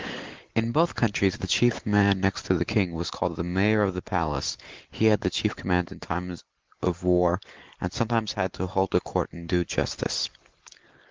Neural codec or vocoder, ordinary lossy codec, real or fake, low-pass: none; Opus, 16 kbps; real; 7.2 kHz